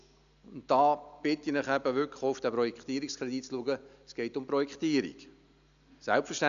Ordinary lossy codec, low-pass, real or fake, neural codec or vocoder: MP3, 96 kbps; 7.2 kHz; real; none